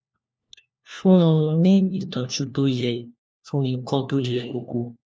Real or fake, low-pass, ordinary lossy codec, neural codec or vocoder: fake; none; none; codec, 16 kHz, 1 kbps, FunCodec, trained on LibriTTS, 50 frames a second